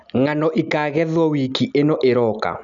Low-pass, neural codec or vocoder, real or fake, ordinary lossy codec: 7.2 kHz; none; real; none